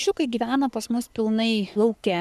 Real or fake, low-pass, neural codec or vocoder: fake; 14.4 kHz; codec, 44.1 kHz, 3.4 kbps, Pupu-Codec